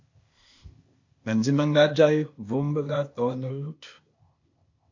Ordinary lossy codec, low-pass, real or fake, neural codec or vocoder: MP3, 48 kbps; 7.2 kHz; fake; codec, 16 kHz, 0.8 kbps, ZipCodec